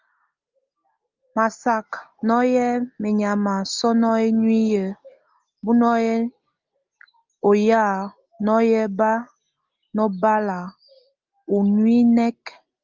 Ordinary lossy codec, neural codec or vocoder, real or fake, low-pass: Opus, 24 kbps; none; real; 7.2 kHz